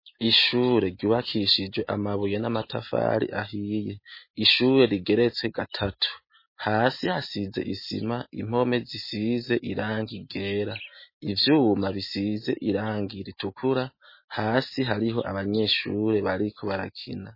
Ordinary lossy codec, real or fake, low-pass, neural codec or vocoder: MP3, 24 kbps; real; 5.4 kHz; none